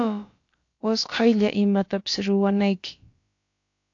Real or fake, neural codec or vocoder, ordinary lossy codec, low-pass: fake; codec, 16 kHz, about 1 kbps, DyCAST, with the encoder's durations; AAC, 48 kbps; 7.2 kHz